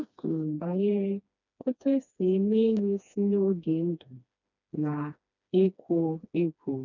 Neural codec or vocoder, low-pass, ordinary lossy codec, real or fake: codec, 16 kHz, 2 kbps, FreqCodec, smaller model; 7.2 kHz; none; fake